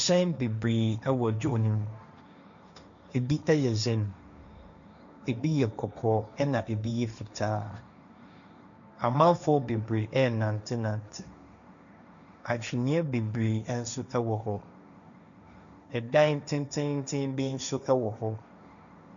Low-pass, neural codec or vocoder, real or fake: 7.2 kHz; codec, 16 kHz, 1.1 kbps, Voila-Tokenizer; fake